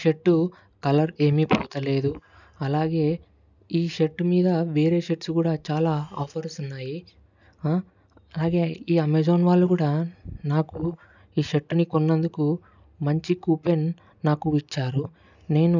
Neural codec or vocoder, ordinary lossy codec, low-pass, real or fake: none; none; 7.2 kHz; real